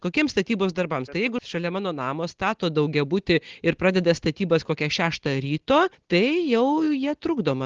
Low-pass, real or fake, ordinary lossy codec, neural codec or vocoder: 7.2 kHz; real; Opus, 16 kbps; none